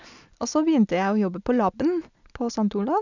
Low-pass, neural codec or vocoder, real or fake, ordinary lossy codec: 7.2 kHz; none; real; none